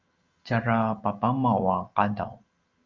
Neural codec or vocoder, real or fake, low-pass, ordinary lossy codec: none; real; 7.2 kHz; Opus, 32 kbps